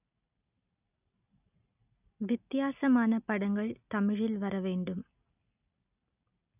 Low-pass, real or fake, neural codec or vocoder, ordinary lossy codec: 3.6 kHz; real; none; none